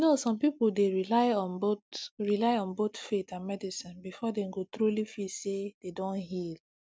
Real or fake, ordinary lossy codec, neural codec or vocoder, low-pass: real; none; none; none